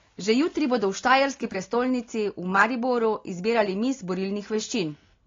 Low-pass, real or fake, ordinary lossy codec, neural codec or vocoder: 7.2 kHz; real; AAC, 32 kbps; none